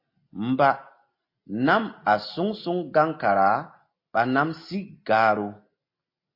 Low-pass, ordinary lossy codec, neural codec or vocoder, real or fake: 5.4 kHz; MP3, 32 kbps; none; real